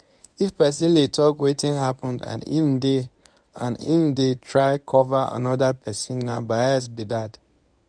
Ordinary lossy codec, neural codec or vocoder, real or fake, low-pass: none; codec, 24 kHz, 0.9 kbps, WavTokenizer, medium speech release version 1; fake; 9.9 kHz